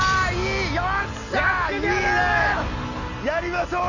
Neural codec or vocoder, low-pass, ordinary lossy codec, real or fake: none; 7.2 kHz; none; real